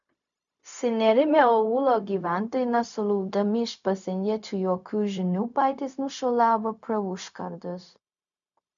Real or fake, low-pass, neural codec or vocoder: fake; 7.2 kHz; codec, 16 kHz, 0.4 kbps, LongCat-Audio-Codec